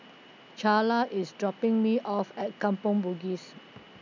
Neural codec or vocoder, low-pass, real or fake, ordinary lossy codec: none; 7.2 kHz; real; none